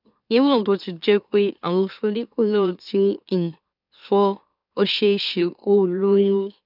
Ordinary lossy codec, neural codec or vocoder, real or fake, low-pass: none; autoencoder, 44.1 kHz, a latent of 192 numbers a frame, MeloTTS; fake; 5.4 kHz